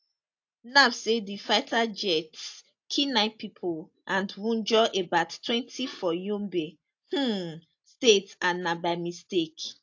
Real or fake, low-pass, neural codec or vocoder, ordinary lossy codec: real; 7.2 kHz; none; AAC, 48 kbps